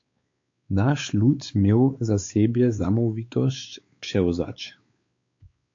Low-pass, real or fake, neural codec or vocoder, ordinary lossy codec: 7.2 kHz; fake; codec, 16 kHz, 4 kbps, X-Codec, WavLM features, trained on Multilingual LibriSpeech; AAC, 48 kbps